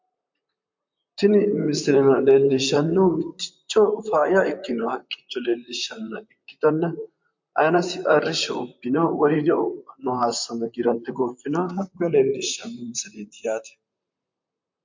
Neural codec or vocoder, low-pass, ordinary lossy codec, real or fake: vocoder, 44.1 kHz, 128 mel bands, Pupu-Vocoder; 7.2 kHz; MP3, 48 kbps; fake